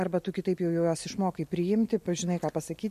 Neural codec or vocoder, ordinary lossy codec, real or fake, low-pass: none; MP3, 64 kbps; real; 14.4 kHz